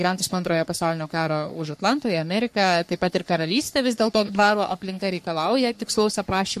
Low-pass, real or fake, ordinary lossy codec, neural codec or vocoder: 14.4 kHz; fake; MP3, 64 kbps; codec, 44.1 kHz, 3.4 kbps, Pupu-Codec